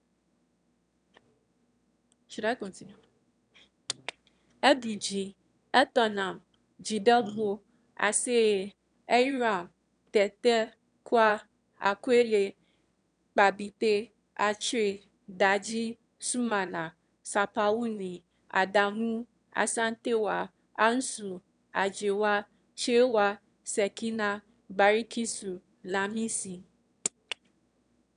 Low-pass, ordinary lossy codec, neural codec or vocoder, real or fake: 9.9 kHz; none; autoencoder, 22.05 kHz, a latent of 192 numbers a frame, VITS, trained on one speaker; fake